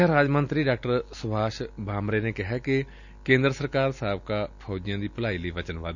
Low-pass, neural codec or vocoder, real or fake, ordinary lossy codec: 7.2 kHz; none; real; none